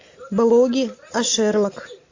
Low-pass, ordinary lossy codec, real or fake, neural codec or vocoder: 7.2 kHz; AAC, 48 kbps; real; none